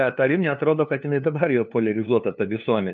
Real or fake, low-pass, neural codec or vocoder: fake; 7.2 kHz; codec, 16 kHz, 2 kbps, FunCodec, trained on LibriTTS, 25 frames a second